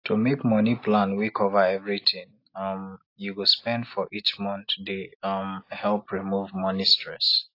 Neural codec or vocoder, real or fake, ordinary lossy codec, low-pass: none; real; AAC, 32 kbps; 5.4 kHz